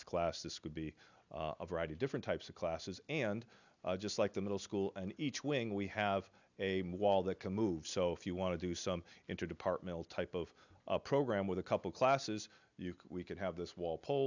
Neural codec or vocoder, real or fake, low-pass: none; real; 7.2 kHz